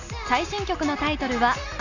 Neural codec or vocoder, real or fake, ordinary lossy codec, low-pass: none; real; none; 7.2 kHz